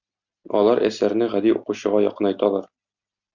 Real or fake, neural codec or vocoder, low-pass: real; none; 7.2 kHz